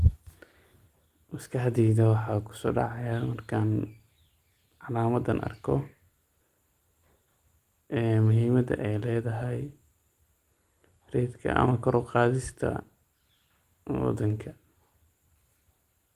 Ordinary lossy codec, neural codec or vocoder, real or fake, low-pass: Opus, 24 kbps; none; real; 14.4 kHz